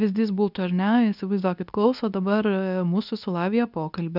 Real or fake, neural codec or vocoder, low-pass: fake; codec, 24 kHz, 0.9 kbps, WavTokenizer, medium speech release version 2; 5.4 kHz